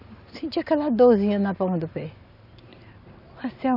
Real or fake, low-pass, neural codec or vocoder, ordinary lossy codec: real; 5.4 kHz; none; none